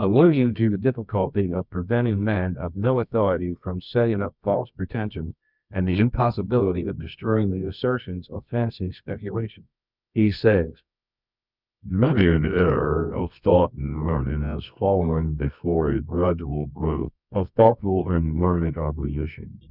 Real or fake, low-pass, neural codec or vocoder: fake; 5.4 kHz; codec, 24 kHz, 0.9 kbps, WavTokenizer, medium music audio release